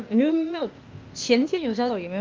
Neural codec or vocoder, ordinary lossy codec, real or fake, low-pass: codec, 16 kHz, 0.8 kbps, ZipCodec; Opus, 32 kbps; fake; 7.2 kHz